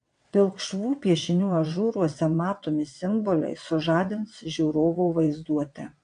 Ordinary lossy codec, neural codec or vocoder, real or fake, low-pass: AAC, 64 kbps; vocoder, 22.05 kHz, 80 mel bands, WaveNeXt; fake; 9.9 kHz